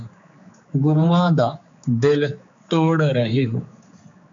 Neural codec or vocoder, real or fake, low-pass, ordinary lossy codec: codec, 16 kHz, 4 kbps, X-Codec, HuBERT features, trained on general audio; fake; 7.2 kHz; MP3, 64 kbps